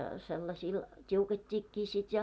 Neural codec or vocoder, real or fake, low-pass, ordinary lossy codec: none; real; none; none